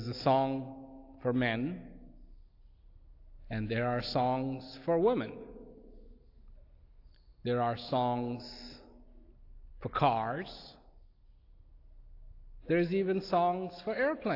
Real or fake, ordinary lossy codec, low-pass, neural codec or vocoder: real; AAC, 32 kbps; 5.4 kHz; none